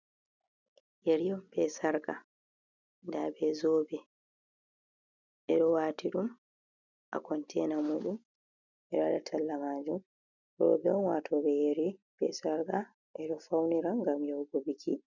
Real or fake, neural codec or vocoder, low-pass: real; none; 7.2 kHz